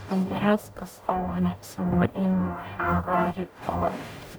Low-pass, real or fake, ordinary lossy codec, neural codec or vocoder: none; fake; none; codec, 44.1 kHz, 0.9 kbps, DAC